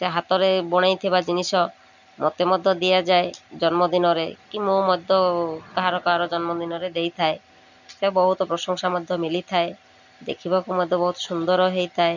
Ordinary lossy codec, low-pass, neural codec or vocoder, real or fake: none; 7.2 kHz; none; real